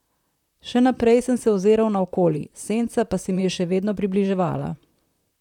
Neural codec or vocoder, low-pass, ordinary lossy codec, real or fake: vocoder, 44.1 kHz, 128 mel bands, Pupu-Vocoder; 19.8 kHz; none; fake